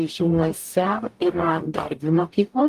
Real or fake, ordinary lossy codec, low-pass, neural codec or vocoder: fake; Opus, 32 kbps; 14.4 kHz; codec, 44.1 kHz, 0.9 kbps, DAC